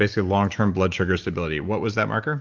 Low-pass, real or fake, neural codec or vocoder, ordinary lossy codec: 7.2 kHz; real; none; Opus, 24 kbps